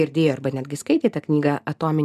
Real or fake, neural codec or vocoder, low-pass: real; none; 14.4 kHz